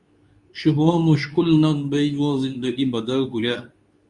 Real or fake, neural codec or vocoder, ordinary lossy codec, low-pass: fake; codec, 24 kHz, 0.9 kbps, WavTokenizer, medium speech release version 2; Opus, 64 kbps; 10.8 kHz